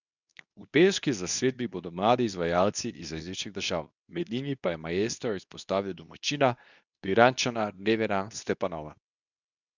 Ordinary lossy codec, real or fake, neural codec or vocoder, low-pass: none; fake; codec, 24 kHz, 0.9 kbps, WavTokenizer, medium speech release version 2; 7.2 kHz